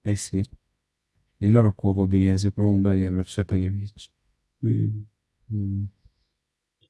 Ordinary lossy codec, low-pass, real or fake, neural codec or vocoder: none; none; fake; codec, 24 kHz, 0.9 kbps, WavTokenizer, medium music audio release